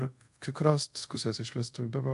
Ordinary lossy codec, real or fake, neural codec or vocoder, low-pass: AAC, 64 kbps; fake; codec, 24 kHz, 0.5 kbps, DualCodec; 10.8 kHz